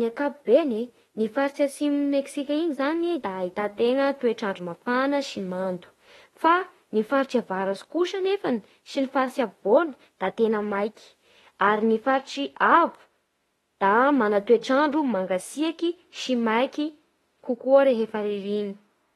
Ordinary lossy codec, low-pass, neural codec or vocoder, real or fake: AAC, 32 kbps; 19.8 kHz; autoencoder, 48 kHz, 32 numbers a frame, DAC-VAE, trained on Japanese speech; fake